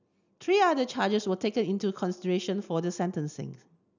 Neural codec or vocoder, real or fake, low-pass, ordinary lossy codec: none; real; 7.2 kHz; none